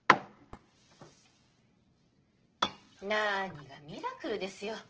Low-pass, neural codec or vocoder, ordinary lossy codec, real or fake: 7.2 kHz; none; Opus, 16 kbps; real